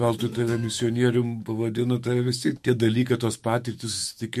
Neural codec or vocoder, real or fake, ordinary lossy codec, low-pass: autoencoder, 48 kHz, 128 numbers a frame, DAC-VAE, trained on Japanese speech; fake; MP3, 64 kbps; 14.4 kHz